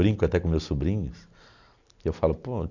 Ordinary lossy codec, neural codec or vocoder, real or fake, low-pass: none; none; real; 7.2 kHz